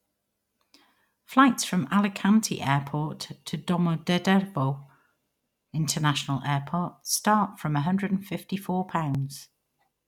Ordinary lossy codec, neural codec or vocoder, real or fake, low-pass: none; none; real; 19.8 kHz